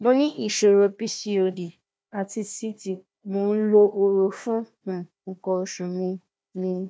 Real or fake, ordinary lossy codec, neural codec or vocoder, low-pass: fake; none; codec, 16 kHz, 1 kbps, FunCodec, trained on Chinese and English, 50 frames a second; none